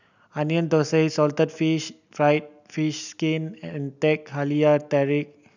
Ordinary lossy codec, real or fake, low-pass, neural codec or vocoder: none; real; 7.2 kHz; none